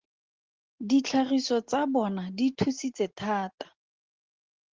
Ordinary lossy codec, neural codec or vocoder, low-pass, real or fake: Opus, 16 kbps; none; 7.2 kHz; real